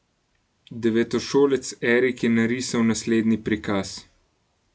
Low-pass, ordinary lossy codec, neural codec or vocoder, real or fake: none; none; none; real